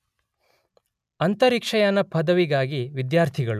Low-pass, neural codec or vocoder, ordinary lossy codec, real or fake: 14.4 kHz; none; none; real